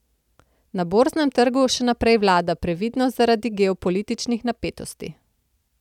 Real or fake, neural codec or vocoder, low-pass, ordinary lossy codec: real; none; 19.8 kHz; none